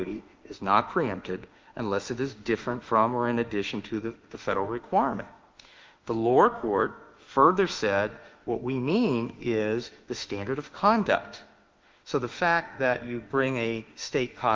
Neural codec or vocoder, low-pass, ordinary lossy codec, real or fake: autoencoder, 48 kHz, 32 numbers a frame, DAC-VAE, trained on Japanese speech; 7.2 kHz; Opus, 32 kbps; fake